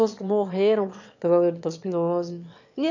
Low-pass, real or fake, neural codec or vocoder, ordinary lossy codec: 7.2 kHz; fake; autoencoder, 22.05 kHz, a latent of 192 numbers a frame, VITS, trained on one speaker; none